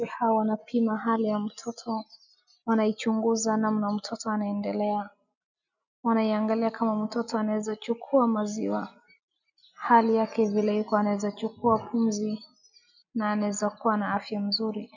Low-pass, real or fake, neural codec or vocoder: 7.2 kHz; real; none